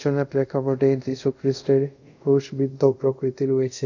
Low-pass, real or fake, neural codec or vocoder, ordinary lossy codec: 7.2 kHz; fake; codec, 24 kHz, 0.5 kbps, DualCodec; none